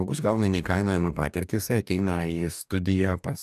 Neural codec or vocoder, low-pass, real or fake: codec, 44.1 kHz, 2.6 kbps, DAC; 14.4 kHz; fake